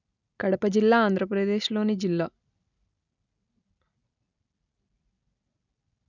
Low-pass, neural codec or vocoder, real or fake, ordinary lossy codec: 7.2 kHz; none; real; none